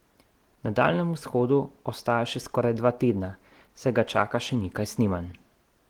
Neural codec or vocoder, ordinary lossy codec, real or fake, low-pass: none; Opus, 16 kbps; real; 19.8 kHz